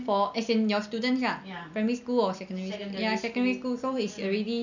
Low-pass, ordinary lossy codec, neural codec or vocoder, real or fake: 7.2 kHz; none; none; real